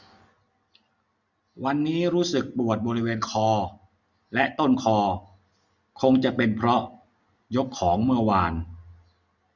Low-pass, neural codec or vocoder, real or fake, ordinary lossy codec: 7.2 kHz; none; real; none